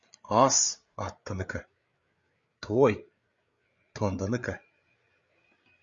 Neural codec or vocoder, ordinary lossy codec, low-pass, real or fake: codec, 16 kHz, 8 kbps, FreqCodec, larger model; Opus, 64 kbps; 7.2 kHz; fake